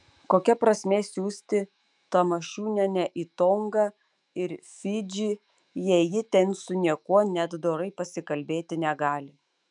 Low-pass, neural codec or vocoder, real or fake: 10.8 kHz; autoencoder, 48 kHz, 128 numbers a frame, DAC-VAE, trained on Japanese speech; fake